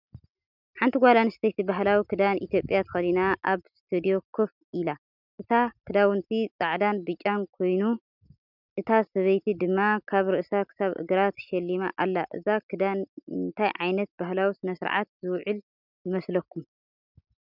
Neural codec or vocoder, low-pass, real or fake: none; 5.4 kHz; real